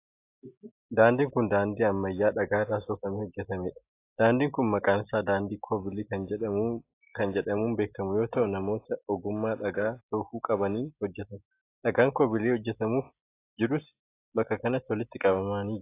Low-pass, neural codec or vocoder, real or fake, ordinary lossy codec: 3.6 kHz; none; real; AAC, 24 kbps